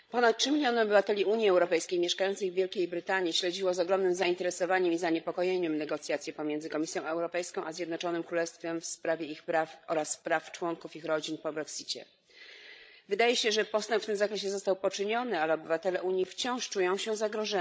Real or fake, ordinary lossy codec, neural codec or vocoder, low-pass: fake; none; codec, 16 kHz, 16 kbps, FreqCodec, larger model; none